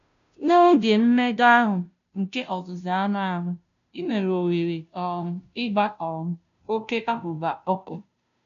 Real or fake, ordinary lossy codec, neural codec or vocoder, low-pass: fake; none; codec, 16 kHz, 0.5 kbps, FunCodec, trained on Chinese and English, 25 frames a second; 7.2 kHz